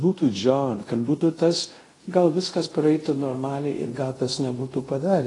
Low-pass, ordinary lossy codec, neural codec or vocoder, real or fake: 10.8 kHz; AAC, 32 kbps; codec, 24 kHz, 0.5 kbps, DualCodec; fake